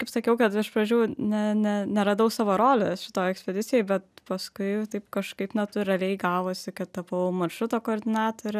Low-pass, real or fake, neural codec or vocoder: 14.4 kHz; real; none